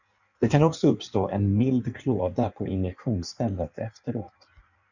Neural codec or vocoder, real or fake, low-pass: codec, 16 kHz in and 24 kHz out, 1.1 kbps, FireRedTTS-2 codec; fake; 7.2 kHz